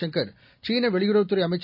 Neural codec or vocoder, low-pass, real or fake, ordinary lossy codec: none; 5.4 kHz; real; none